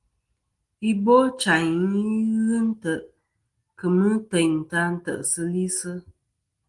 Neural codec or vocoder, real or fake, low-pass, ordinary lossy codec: none; real; 10.8 kHz; Opus, 24 kbps